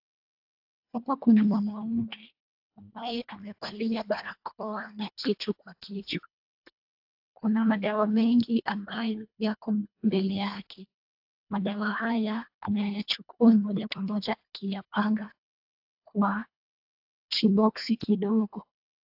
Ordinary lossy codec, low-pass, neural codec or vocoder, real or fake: AAC, 48 kbps; 5.4 kHz; codec, 24 kHz, 1.5 kbps, HILCodec; fake